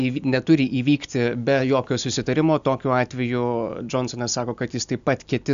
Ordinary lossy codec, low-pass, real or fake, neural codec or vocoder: AAC, 96 kbps; 7.2 kHz; real; none